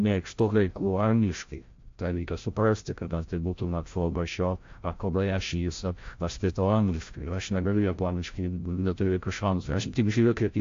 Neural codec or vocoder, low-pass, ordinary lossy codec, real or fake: codec, 16 kHz, 0.5 kbps, FreqCodec, larger model; 7.2 kHz; AAC, 48 kbps; fake